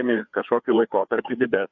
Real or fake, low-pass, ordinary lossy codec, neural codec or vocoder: fake; 7.2 kHz; MP3, 64 kbps; codec, 16 kHz, 4 kbps, FreqCodec, larger model